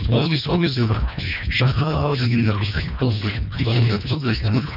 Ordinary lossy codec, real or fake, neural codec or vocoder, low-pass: none; fake; codec, 24 kHz, 1.5 kbps, HILCodec; 5.4 kHz